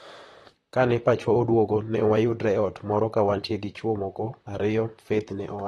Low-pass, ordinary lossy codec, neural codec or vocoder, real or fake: 19.8 kHz; AAC, 32 kbps; vocoder, 44.1 kHz, 128 mel bands, Pupu-Vocoder; fake